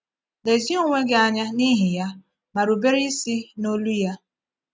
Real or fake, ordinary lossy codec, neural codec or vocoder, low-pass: real; none; none; none